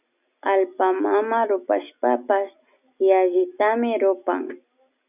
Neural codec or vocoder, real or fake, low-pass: none; real; 3.6 kHz